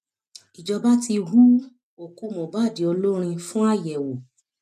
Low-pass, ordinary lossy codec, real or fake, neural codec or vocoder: 14.4 kHz; none; real; none